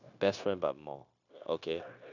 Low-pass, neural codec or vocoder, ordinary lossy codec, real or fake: 7.2 kHz; codec, 16 kHz, 0.9 kbps, LongCat-Audio-Codec; none; fake